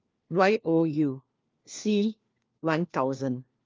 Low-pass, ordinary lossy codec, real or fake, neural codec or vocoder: 7.2 kHz; Opus, 32 kbps; fake; codec, 16 kHz, 1 kbps, FunCodec, trained on LibriTTS, 50 frames a second